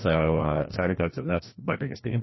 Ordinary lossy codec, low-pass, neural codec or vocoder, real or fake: MP3, 24 kbps; 7.2 kHz; codec, 16 kHz, 1 kbps, FreqCodec, larger model; fake